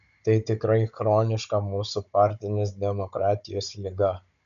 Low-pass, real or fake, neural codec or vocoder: 7.2 kHz; fake; codec, 16 kHz, 16 kbps, FunCodec, trained on Chinese and English, 50 frames a second